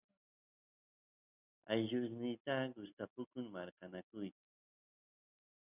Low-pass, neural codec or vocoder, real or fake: 3.6 kHz; none; real